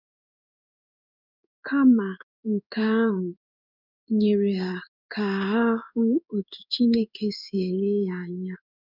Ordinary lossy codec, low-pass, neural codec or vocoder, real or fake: none; 5.4 kHz; codec, 16 kHz in and 24 kHz out, 1 kbps, XY-Tokenizer; fake